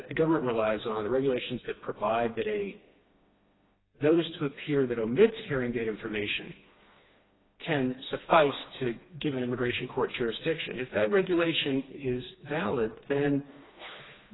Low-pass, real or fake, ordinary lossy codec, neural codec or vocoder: 7.2 kHz; fake; AAC, 16 kbps; codec, 16 kHz, 2 kbps, FreqCodec, smaller model